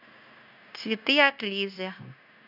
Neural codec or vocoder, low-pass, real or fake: codec, 16 kHz, 0.9 kbps, LongCat-Audio-Codec; 5.4 kHz; fake